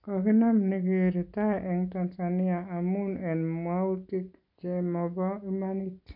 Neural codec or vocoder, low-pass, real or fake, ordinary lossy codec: none; 5.4 kHz; real; none